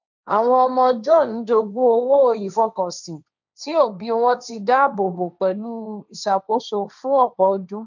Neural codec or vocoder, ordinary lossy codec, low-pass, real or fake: codec, 16 kHz, 1.1 kbps, Voila-Tokenizer; none; 7.2 kHz; fake